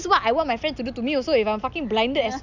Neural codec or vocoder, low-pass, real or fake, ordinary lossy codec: none; 7.2 kHz; real; none